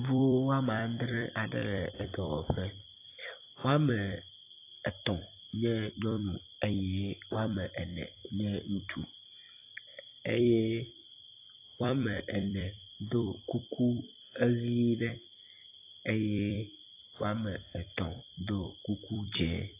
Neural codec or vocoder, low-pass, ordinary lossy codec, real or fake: vocoder, 44.1 kHz, 128 mel bands every 256 samples, BigVGAN v2; 3.6 kHz; AAC, 24 kbps; fake